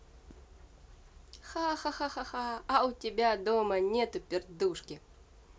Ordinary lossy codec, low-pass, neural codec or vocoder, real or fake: none; none; none; real